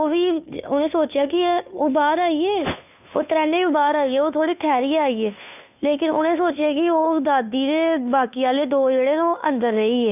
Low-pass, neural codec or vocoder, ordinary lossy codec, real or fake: 3.6 kHz; codec, 16 kHz, 2 kbps, FunCodec, trained on Chinese and English, 25 frames a second; none; fake